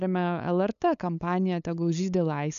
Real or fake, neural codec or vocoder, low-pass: fake; codec, 16 kHz, 8 kbps, FunCodec, trained on LibriTTS, 25 frames a second; 7.2 kHz